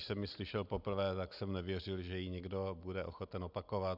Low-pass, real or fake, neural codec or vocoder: 5.4 kHz; real; none